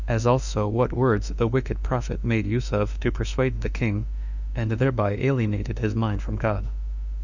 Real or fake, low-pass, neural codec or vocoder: fake; 7.2 kHz; autoencoder, 48 kHz, 32 numbers a frame, DAC-VAE, trained on Japanese speech